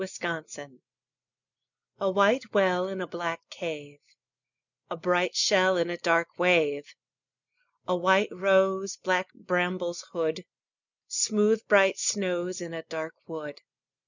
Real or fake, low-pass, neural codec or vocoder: real; 7.2 kHz; none